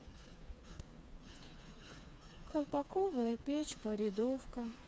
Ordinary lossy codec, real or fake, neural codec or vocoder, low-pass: none; fake; codec, 16 kHz, 4 kbps, FreqCodec, smaller model; none